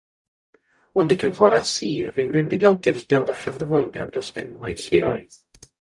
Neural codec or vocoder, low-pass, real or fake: codec, 44.1 kHz, 0.9 kbps, DAC; 10.8 kHz; fake